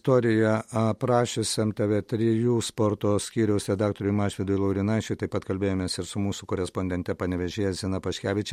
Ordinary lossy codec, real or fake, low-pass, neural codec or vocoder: MP3, 64 kbps; real; 19.8 kHz; none